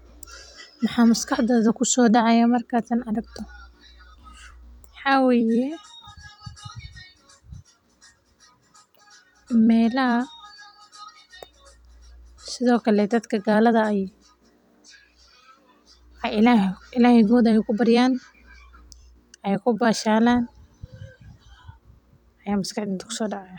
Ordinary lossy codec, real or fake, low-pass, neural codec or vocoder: none; fake; 19.8 kHz; vocoder, 44.1 kHz, 128 mel bands every 256 samples, BigVGAN v2